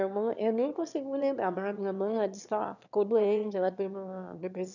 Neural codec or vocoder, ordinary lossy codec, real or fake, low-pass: autoencoder, 22.05 kHz, a latent of 192 numbers a frame, VITS, trained on one speaker; none; fake; 7.2 kHz